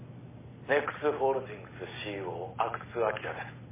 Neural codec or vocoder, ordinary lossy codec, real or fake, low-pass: none; MP3, 16 kbps; real; 3.6 kHz